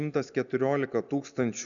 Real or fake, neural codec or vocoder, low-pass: real; none; 7.2 kHz